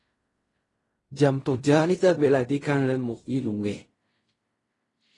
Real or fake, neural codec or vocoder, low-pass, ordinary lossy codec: fake; codec, 16 kHz in and 24 kHz out, 0.4 kbps, LongCat-Audio-Codec, fine tuned four codebook decoder; 10.8 kHz; AAC, 32 kbps